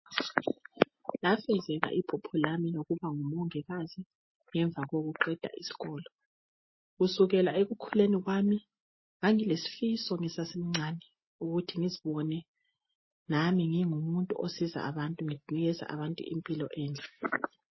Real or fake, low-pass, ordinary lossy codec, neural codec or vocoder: real; 7.2 kHz; MP3, 24 kbps; none